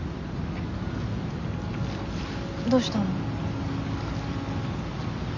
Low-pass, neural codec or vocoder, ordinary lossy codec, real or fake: 7.2 kHz; none; none; real